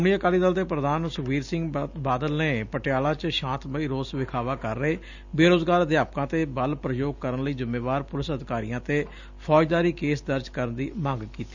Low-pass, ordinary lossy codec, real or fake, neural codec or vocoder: 7.2 kHz; none; real; none